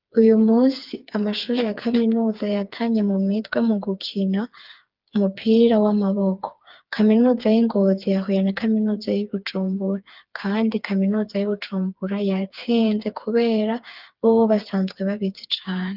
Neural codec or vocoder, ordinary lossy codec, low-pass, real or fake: codec, 16 kHz, 4 kbps, FreqCodec, smaller model; Opus, 24 kbps; 5.4 kHz; fake